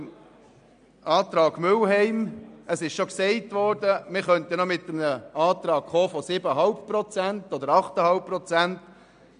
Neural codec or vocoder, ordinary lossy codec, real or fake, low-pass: none; none; real; 9.9 kHz